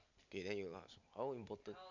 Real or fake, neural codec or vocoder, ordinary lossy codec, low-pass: real; none; none; 7.2 kHz